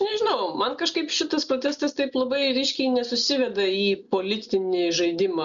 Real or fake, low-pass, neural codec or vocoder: real; 7.2 kHz; none